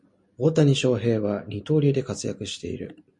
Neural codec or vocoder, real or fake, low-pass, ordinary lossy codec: none; real; 10.8 kHz; MP3, 48 kbps